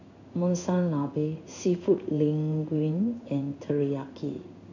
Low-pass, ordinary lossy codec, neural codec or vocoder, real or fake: 7.2 kHz; none; codec, 16 kHz in and 24 kHz out, 1 kbps, XY-Tokenizer; fake